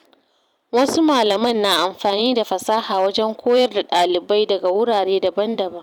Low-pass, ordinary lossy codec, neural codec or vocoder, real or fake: 19.8 kHz; none; vocoder, 44.1 kHz, 128 mel bands every 512 samples, BigVGAN v2; fake